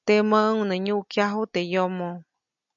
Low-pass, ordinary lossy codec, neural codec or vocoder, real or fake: 7.2 kHz; MP3, 64 kbps; none; real